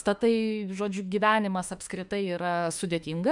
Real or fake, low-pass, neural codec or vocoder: fake; 10.8 kHz; autoencoder, 48 kHz, 32 numbers a frame, DAC-VAE, trained on Japanese speech